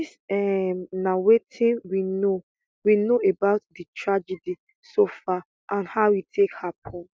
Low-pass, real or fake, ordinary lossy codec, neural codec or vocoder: none; real; none; none